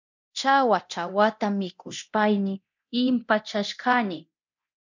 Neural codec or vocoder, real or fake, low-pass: codec, 24 kHz, 0.9 kbps, DualCodec; fake; 7.2 kHz